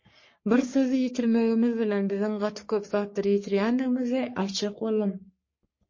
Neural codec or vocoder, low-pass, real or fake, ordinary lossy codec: codec, 44.1 kHz, 3.4 kbps, Pupu-Codec; 7.2 kHz; fake; MP3, 32 kbps